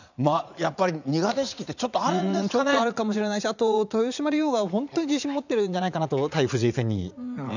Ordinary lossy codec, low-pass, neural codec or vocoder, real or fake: none; 7.2 kHz; vocoder, 44.1 kHz, 80 mel bands, Vocos; fake